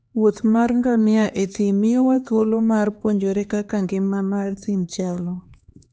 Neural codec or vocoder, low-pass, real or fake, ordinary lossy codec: codec, 16 kHz, 2 kbps, X-Codec, HuBERT features, trained on LibriSpeech; none; fake; none